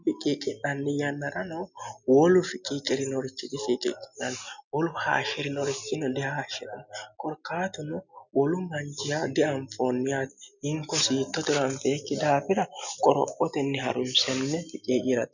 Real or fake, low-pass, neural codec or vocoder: real; 7.2 kHz; none